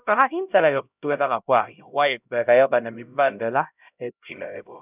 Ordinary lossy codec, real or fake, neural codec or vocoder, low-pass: none; fake; codec, 16 kHz, 0.5 kbps, X-Codec, HuBERT features, trained on LibriSpeech; 3.6 kHz